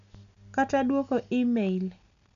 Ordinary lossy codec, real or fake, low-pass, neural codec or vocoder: none; real; 7.2 kHz; none